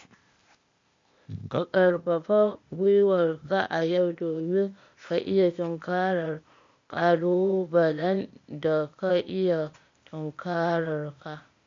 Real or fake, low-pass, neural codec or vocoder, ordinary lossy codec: fake; 7.2 kHz; codec, 16 kHz, 0.8 kbps, ZipCodec; MP3, 48 kbps